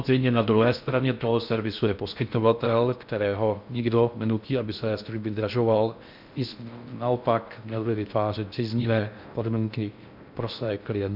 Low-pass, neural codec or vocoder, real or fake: 5.4 kHz; codec, 16 kHz in and 24 kHz out, 0.6 kbps, FocalCodec, streaming, 4096 codes; fake